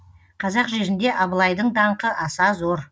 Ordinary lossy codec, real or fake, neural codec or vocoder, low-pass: none; real; none; none